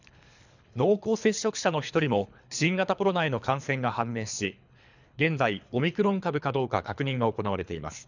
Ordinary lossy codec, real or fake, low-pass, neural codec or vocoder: none; fake; 7.2 kHz; codec, 24 kHz, 3 kbps, HILCodec